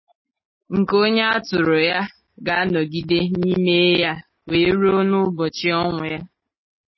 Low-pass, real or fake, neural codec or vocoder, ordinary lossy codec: 7.2 kHz; real; none; MP3, 24 kbps